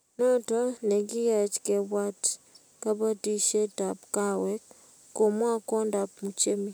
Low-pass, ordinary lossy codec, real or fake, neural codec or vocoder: none; none; real; none